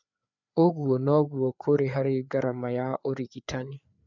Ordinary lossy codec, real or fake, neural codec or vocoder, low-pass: none; fake; codec, 16 kHz, 4 kbps, FreqCodec, larger model; 7.2 kHz